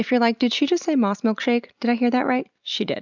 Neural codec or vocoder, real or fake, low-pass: none; real; 7.2 kHz